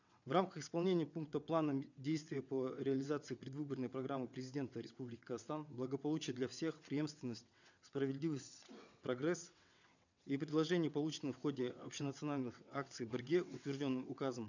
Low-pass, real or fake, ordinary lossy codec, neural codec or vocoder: 7.2 kHz; fake; none; vocoder, 22.05 kHz, 80 mel bands, WaveNeXt